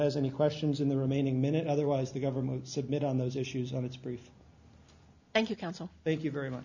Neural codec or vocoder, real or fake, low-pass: none; real; 7.2 kHz